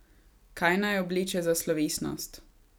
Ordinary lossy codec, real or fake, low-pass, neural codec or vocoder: none; real; none; none